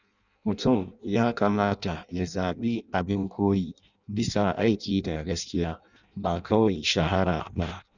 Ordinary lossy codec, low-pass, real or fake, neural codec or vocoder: none; 7.2 kHz; fake; codec, 16 kHz in and 24 kHz out, 0.6 kbps, FireRedTTS-2 codec